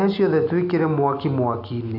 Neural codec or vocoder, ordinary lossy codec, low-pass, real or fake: none; AAC, 32 kbps; 5.4 kHz; real